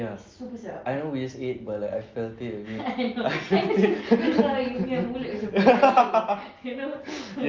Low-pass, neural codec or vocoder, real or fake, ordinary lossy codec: 7.2 kHz; none; real; Opus, 24 kbps